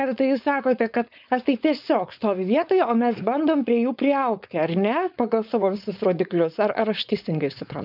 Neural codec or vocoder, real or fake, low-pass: codec, 16 kHz, 4.8 kbps, FACodec; fake; 5.4 kHz